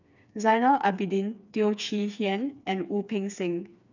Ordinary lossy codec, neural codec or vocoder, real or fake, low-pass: none; codec, 16 kHz, 4 kbps, FreqCodec, smaller model; fake; 7.2 kHz